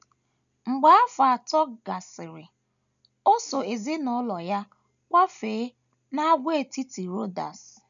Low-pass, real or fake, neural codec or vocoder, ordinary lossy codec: 7.2 kHz; real; none; none